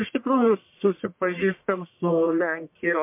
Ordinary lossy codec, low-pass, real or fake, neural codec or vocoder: MP3, 32 kbps; 3.6 kHz; fake; codec, 44.1 kHz, 1.7 kbps, Pupu-Codec